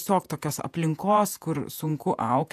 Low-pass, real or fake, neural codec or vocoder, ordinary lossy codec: 14.4 kHz; fake; vocoder, 48 kHz, 128 mel bands, Vocos; AAC, 96 kbps